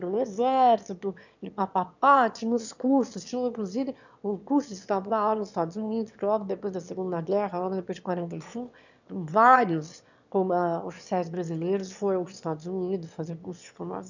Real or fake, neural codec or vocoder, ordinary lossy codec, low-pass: fake; autoencoder, 22.05 kHz, a latent of 192 numbers a frame, VITS, trained on one speaker; none; 7.2 kHz